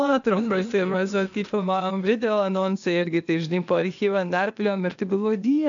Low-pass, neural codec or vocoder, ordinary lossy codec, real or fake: 7.2 kHz; codec, 16 kHz, 0.8 kbps, ZipCodec; AAC, 64 kbps; fake